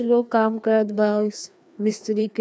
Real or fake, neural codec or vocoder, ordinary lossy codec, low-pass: fake; codec, 16 kHz, 2 kbps, FreqCodec, larger model; none; none